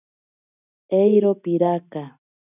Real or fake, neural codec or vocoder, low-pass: real; none; 3.6 kHz